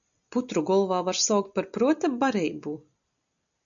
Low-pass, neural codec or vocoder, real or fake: 7.2 kHz; none; real